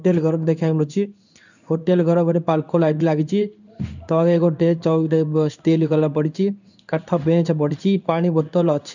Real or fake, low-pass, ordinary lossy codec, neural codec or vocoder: fake; 7.2 kHz; none; codec, 16 kHz in and 24 kHz out, 1 kbps, XY-Tokenizer